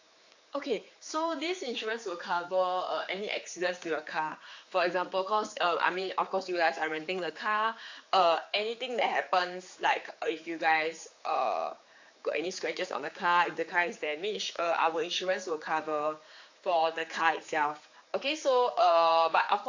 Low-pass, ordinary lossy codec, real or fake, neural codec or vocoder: 7.2 kHz; AAC, 48 kbps; fake; codec, 16 kHz, 4 kbps, X-Codec, HuBERT features, trained on general audio